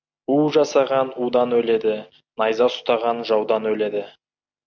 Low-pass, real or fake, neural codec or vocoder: 7.2 kHz; real; none